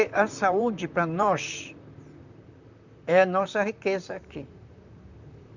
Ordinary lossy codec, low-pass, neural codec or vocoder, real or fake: none; 7.2 kHz; vocoder, 44.1 kHz, 128 mel bands, Pupu-Vocoder; fake